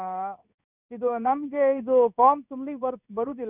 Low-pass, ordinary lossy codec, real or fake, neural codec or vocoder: 3.6 kHz; none; fake; codec, 16 kHz in and 24 kHz out, 1 kbps, XY-Tokenizer